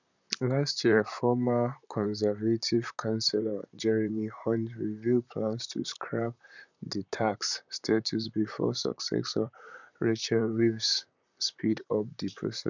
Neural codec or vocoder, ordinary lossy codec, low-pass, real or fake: vocoder, 44.1 kHz, 128 mel bands, Pupu-Vocoder; none; 7.2 kHz; fake